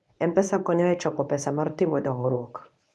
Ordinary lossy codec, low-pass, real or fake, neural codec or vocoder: none; none; fake; codec, 24 kHz, 0.9 kbps, WavTokenizer, medium speech release version 1